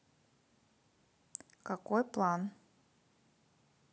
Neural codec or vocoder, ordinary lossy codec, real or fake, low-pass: none; none; real; none